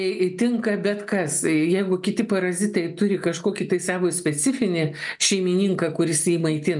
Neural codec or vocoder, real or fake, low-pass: none; real; 10.8 kHz